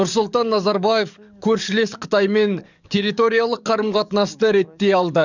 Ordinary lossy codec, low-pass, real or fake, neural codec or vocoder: none; 7.2 kHz; fake; codec, 44.1 kHz, 7.8 kbps, DAC